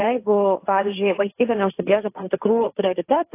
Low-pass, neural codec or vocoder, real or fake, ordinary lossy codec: 3.6 kHz; codec, 16 kHz, 1.1 kbps, Voila-Tokenizer; fake; AAC, 24 kbps